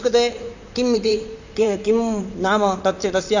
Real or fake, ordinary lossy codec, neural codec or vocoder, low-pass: fake; none; vocoder, 44.1 kHz, 128 mel bands, Pupu-Vocoder; 7.2 kHz